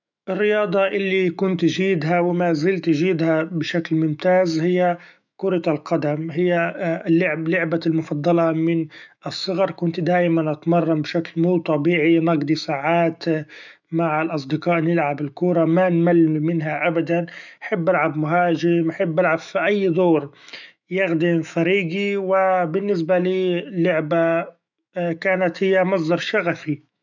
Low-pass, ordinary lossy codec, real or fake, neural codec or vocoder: 7.2 kHz; none; fake; autoencoder, 48 kHz, 128 numbers a frame, DAC-VAE, trained on Japanese speech